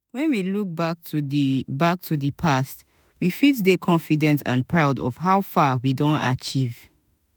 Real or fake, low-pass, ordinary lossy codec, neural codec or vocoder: fake; none; none; autoencoder, 48 kHz, 32 numbers a frame, DAC-VAE, trained on Japanese speech